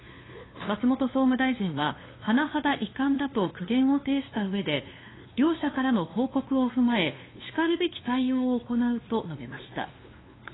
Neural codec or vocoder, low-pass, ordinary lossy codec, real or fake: codec, 16 kHz, 2 kbps, FunCodec, trained on LibriTTS, 25 frames a second; 7.2 kHz; AAC, 16 kbps; fake